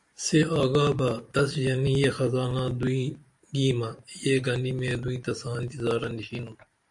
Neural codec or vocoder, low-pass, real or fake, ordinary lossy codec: vocoder, 44.1 kHz, 128 mel bands every 512 samples, BigVGAN v2; 10.8 kHz; fake; AAC, 64 kbps